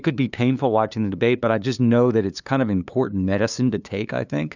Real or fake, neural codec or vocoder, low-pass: fake; codec, 16 kHz, 2 kbps, FunCodec, trained on LibriTTS, 25 frames a second; 7.2 kHz